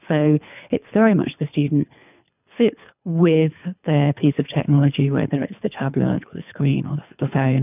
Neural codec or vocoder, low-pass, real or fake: codec, 24 kHz, 3 kbps, HILCodec; 3.6 kHz; fake